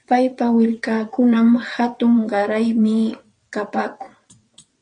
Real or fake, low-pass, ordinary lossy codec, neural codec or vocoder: fake; 9.9 kHz; MP3, 48 kbps; vocoder, 22.05 kHz, 80 mel bands, WaveNeXt